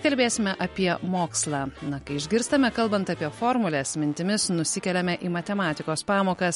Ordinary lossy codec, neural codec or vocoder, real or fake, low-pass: MP3, 48 kbps; none; real; 19.8 kHz